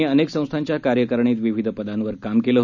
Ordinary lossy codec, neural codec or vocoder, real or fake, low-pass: none; none; real; 7.2 kHz